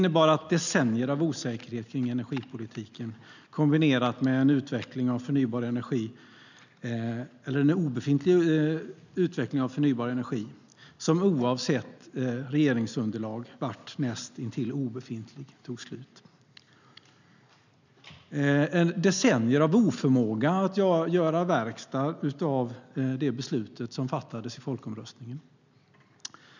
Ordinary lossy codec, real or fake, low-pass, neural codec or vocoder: none; real; 7.2 kHz; none